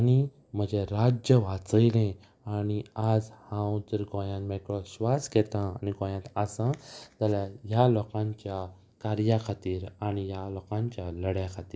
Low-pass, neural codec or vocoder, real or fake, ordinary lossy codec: none; none; real; none